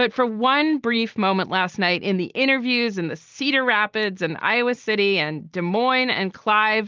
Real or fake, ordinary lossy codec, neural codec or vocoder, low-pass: real; Opus, 24 kbps; none; 7.2 kHz